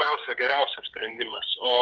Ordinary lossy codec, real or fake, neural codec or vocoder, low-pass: Opus, 32 kbps; fake; codec, 16 kHz, 8 kbps, FreqCodec, smaller model; 7.2 kHz